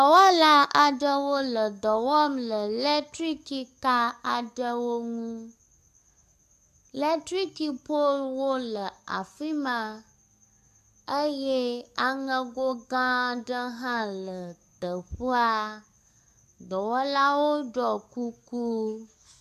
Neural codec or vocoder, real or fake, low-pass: codec, 44.1 kHz, 7.8 kbps, DAC; fake; 14.4 kHz